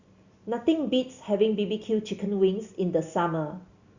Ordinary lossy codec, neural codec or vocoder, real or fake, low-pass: Opus, 64 kbps; none; real; 7.2 kHz